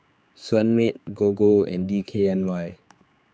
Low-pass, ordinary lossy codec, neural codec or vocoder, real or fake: none; none; codec, 16 kHz, 4 kbps, X-Codec, HuBERT features, trained on general audio; fake